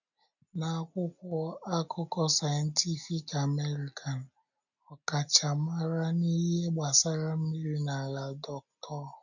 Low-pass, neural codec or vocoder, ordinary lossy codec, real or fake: 7.2 kHz; none; none; real